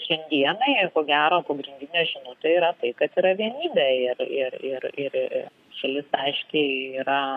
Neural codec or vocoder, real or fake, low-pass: codec, 44.1 kHz, 7.8 kbps, Pupu-Codec; fake; 14.4 kHz